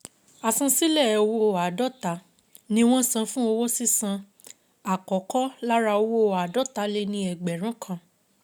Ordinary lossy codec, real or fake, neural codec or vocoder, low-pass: none; real; none; none